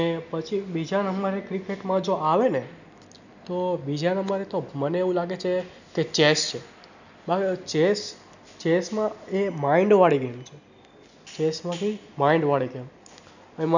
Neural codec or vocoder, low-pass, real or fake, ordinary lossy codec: none; 7.2 kHz; real; none